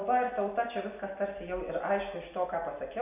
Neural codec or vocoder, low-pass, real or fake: none; 3.6 kHz; real